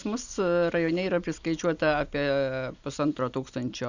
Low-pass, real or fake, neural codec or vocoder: 7.2 kHz; real; none